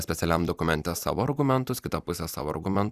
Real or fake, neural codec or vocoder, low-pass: fake; vocoder, 44.1 kHz, 128 mel bands every 256 samples, BigVGAN v2; 14.4 kHz